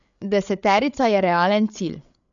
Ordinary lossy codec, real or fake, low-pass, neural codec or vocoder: none; fake; 7.2 kHz; codec, 16 kHz, 8 kbps, FreqCodec, larger model